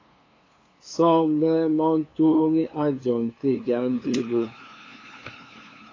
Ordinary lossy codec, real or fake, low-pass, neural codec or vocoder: AAC, 32 kbps; fake; 7.2 kHz; codec, 16 kHz, 2 kbps, FunCodec, trained on LibriTTS, 25 frames a second